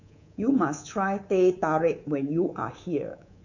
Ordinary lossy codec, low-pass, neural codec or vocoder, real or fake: none; 7.2 kHz; codec, 24 kHz, 3.1 kbps, DualCodec; fake